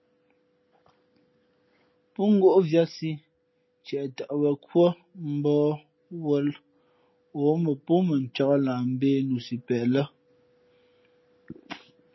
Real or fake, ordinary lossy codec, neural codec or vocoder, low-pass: real; MP3, 24 kbps; none; 7.2 kHz